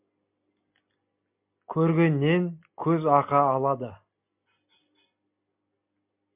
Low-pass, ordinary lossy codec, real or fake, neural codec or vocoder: 3.6 kHz; MP3, 32 kbps; real; none